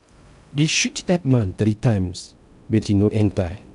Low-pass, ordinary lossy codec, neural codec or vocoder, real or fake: 10.8 kHz; none; codec, 16 kHz in and 24 kHz out, 0.6 kbps, FocalCodec, streaming, 4096 codes; fake